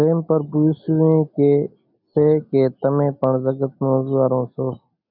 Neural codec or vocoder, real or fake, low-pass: none; real; 5.4 kHz